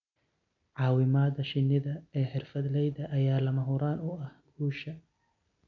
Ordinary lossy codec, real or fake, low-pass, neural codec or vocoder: none; real; 7.2 kHz; none